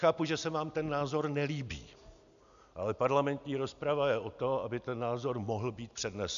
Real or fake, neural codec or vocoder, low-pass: real; none; 7.2 kHz